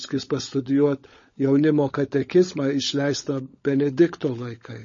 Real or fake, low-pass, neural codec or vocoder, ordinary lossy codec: fake; 7.2 kHz; codec, 16 kHz, 16 kbps, FunCodec, trained on LibriTTS, 50 frames a second; MP3, 32 kbps